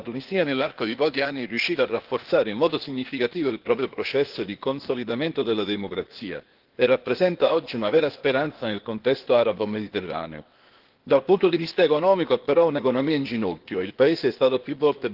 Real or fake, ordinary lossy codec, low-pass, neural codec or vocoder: fake; Opus, 16 kbps; 5.4 kHz; codec, 16 kHz, 0.8 kbps, ZipCodec